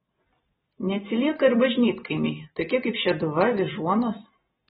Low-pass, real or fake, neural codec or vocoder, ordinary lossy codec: 19.8 kHz; real; none; AAC, 16 kbps